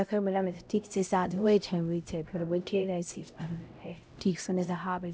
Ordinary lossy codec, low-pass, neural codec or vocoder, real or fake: none; none; codec, 16 kHz, 0.5 kbps, X-Codec, HuBERT features, trained on LibriSpeech; fake